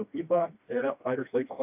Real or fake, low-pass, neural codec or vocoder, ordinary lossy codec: fake; 3.6 kHz; codec, 16 kHz, 1 kbps, FreqCodec, smaller model; AAC, 32 kbps